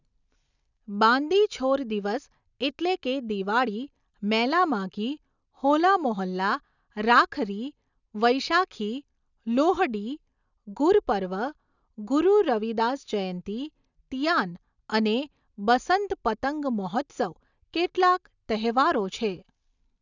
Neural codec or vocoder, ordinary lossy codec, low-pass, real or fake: none; none; 7.2 kHz; real